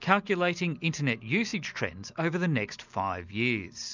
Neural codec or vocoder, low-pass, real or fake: none; 7.2 kHz; real